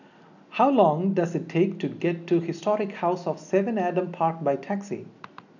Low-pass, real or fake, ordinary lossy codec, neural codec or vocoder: 7.2 kHz; real; none; none